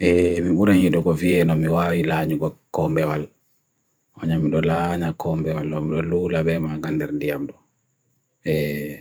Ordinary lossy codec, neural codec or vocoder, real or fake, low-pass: none; vocoder, 44.1 kHz, 128 mel bands every 512 samples, BigVGAN v2; fake; none